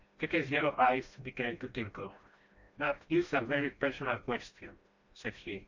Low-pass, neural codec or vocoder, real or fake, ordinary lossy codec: 7.2 kHz; codec, 16 kHz, 1 kbps, FreqCodec, smaller model; fake; MP3, 48 kbps